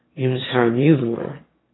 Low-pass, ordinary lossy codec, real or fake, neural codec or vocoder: 7.2 kHz; AAC, 16 kbps; fake; autoencoder, 22.05 kHz, a latent of 192 numbers a frame, VITS, trained on one speaker